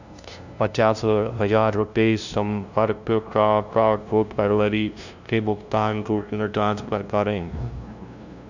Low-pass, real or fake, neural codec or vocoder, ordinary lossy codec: 7.2 kHz; fake; codec, 16 kHz, 0.5 kbps, FunCodec, trained on LibriTTS, 25 frames a second; none